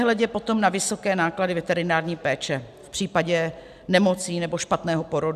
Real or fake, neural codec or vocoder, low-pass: real; none; 14.4 kHz